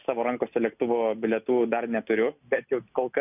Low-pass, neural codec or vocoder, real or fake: 3.6 kHz; none; real